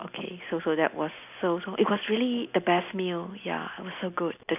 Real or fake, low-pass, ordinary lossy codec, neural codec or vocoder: real; 3.6 kHz; none; none